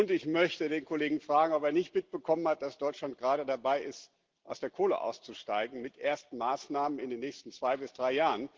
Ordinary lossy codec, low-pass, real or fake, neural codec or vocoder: Opus, 16 kbps; 7.2 kHz; real; none